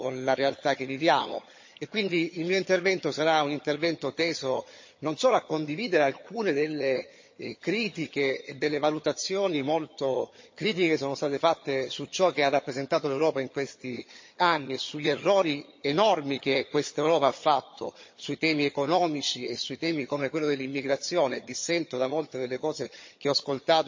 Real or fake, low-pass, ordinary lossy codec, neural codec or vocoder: fake; 7.2 kHz; MP3, 32 kbps; vocoder, 22.05 kHz, 80 mel bands, HiFi-GAN